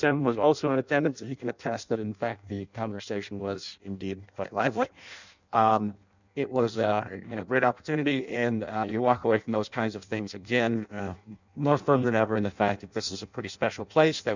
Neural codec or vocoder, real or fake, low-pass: codec, 16 kHz in and 24 kHz out, 0.6 kbps, FireRedTTS-2 codec; fake; 7.2 kHz